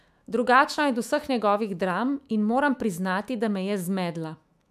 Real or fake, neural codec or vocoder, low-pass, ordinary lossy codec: fake; autoencoder, 48 kHz, 128 numbers a frame, DAC-VAE, trained on Japanese speech; 14.4 kHz; none